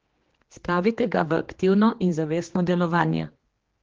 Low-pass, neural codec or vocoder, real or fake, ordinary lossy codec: 7.2 kHz; codec, 16 kHz, 2 kbps, X-Codec, HuBERT features, trained on general audio; fake; Opus, 16 kbps